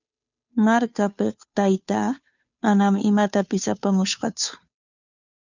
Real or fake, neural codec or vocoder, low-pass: fake; codec, 16 kHz, 2 kbps, FunCodec, trained on Chinese and English, 25 frames a second; 7.2 kHz